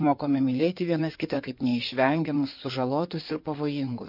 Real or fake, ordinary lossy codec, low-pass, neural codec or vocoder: fake; MP3, 32 kbps; 5.4 kHz; codec, 16 kHz in and 24 kHz out, 2.2 kbps, FireRedTTS-2 codec